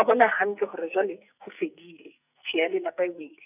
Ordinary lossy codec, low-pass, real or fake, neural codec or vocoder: none; 3.6 kHz; fake; codec, 44.1 kHz, 2.6 kbps, SNAC